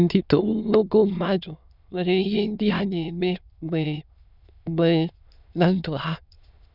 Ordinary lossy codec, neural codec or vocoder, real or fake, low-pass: none; autoencoder, 22.05 kHz, a latent of 192 numbers a frame, VITS, trained on many speakers; fake; 5.4 kHz